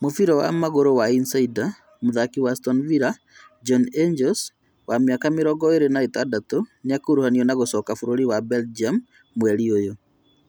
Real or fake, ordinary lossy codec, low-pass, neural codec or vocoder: real; none; none; none